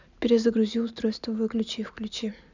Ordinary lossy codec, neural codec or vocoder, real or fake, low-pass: none; none; real; 7.2 kHz